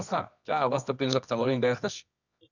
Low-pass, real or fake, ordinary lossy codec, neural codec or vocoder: 7.2 kHz; fake; none; codec, 24 kHz, 0.9 kbps, WavTokenizer, medium music audio release